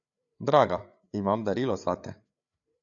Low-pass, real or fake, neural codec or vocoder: 7.2 kHz; fake; codec, 16 kHz, 8 kbps, FreqCodec, larger model